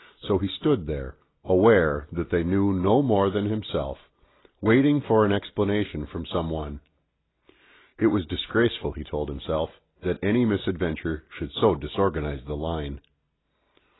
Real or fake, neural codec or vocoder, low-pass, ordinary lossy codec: real; none; 7.2 kHz; AAC, 16 kbps